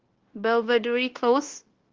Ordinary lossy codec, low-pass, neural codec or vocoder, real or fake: Opus, 16 kbps; 7.2 kHz; codec, 16 kHz, 0.9 kbps, LongCat-Audio-Codec; fake